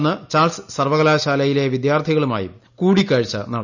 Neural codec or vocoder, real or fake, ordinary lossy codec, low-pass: none; real; none; 7.2 kHz